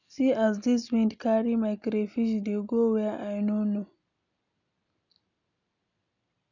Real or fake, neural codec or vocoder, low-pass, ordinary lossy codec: real; none; 7.2 kHz; none